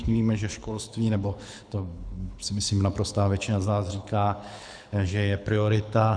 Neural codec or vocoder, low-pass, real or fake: codec, 24 kHz, 6 kbps, HILCodec; 9.9 kHz; fake